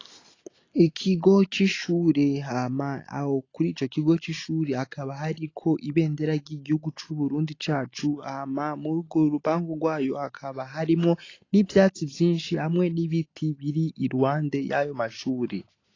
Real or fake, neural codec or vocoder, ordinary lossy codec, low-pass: real; none; AAC, 32 kbps; 7.2 kHz